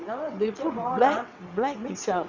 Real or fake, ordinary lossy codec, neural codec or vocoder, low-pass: fake; none; vocoder, 22.05 kHz, 80 mel bands, WaveNeXt; 7.2 kHz